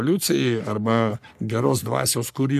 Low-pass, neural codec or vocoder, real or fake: 14.4 kHz; codec, 44.1 kHz, 3.4 kbps, Pupu-Codec; fake